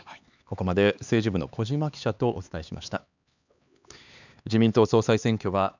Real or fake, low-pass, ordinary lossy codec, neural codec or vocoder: fake; 7.2 kHz; none; codec, 16 kHz, 4 kbps, X-Codec, HuBERT features, trained on LibriSpeech